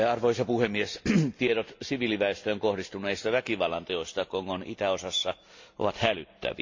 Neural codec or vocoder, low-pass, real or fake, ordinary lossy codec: none; 7.2 kHz; real; MP3, 48 kbps